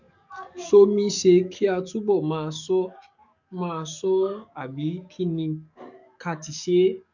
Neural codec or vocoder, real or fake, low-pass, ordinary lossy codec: codec, 44.1 kHz, 7.8 kbps, DAC; fake; 7.2 kHz; none